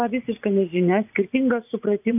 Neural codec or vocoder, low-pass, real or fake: vocoder, 44.1 kHz, 80 mel bands, Vocos; 3.6 kHz; fake